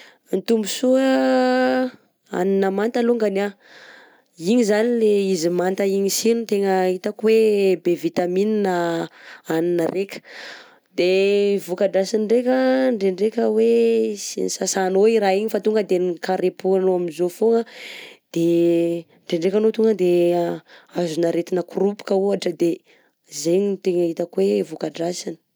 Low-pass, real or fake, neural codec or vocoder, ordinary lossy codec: none; real; none; none